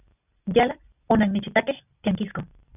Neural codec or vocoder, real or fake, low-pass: none; real; 3.6 kHz